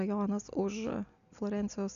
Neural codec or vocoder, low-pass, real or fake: none; 7.2 kHz; real